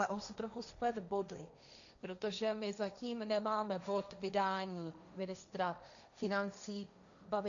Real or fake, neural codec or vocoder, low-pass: fake; codec, 16 kHz, 1.1 kbps, Voila-Tokenizer; 7.2 kHz